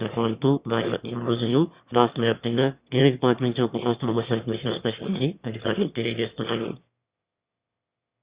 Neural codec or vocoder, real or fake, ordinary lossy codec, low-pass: autoencoder, 22.05 kHz, a latent of 192 numbers a frame, VITS, trained on one speaker; fake; Opus, 64 kbps; 3.6 kHz